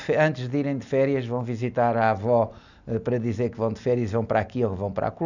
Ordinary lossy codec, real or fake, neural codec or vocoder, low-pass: none; real; none; 7.2 kHz